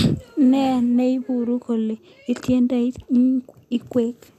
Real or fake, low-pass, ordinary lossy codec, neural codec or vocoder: real; 14.4 kHz; none; none